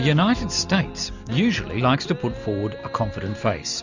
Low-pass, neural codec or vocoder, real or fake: 7.2 kHz; none; real